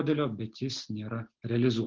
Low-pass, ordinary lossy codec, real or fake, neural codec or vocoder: 7.2 kHz; Opus, 16 kbps; real; none